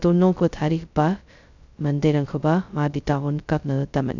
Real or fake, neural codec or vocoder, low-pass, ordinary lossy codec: fake; codec, 16 kHz, 0.2 kbps, FocalCodec; 7.2 kHz; none